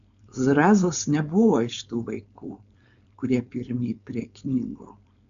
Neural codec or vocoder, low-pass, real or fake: codec, 16 kHz, 4.8 kbps, FACodec; 7.2 kHz; fake